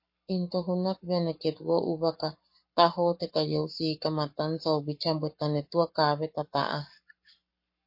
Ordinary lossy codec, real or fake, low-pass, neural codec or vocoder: MP3, 32 kbps; fake; 5.4 kHz; codec, 44.1 kHz, 7.8 kbps, Pupu-Codec